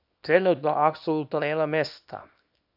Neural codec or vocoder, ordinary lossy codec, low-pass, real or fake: codec, 24 kHz, 0.9 kbps, WavTokenizer, small release; AAC, 48 kbps; 5.4 kHz; fake